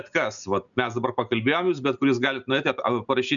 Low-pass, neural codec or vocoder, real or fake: 7.2 kHz; none; real